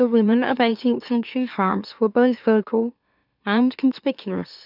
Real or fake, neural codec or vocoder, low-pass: fake; autoencoder, 44.1 kHz, a latent of 192 numbers a frame, MeloTTS; 5.4 kHz